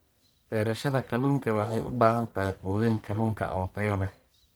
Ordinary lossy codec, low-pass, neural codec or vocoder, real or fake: none; none; codec, 44.1 kHz, 1.7 kbps, Pupu-Codec; fake